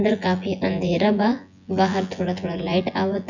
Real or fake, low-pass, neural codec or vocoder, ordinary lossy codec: fake; 7.2 kHz; vocoder, 24 kHz, 100 mel bands, Vocos; none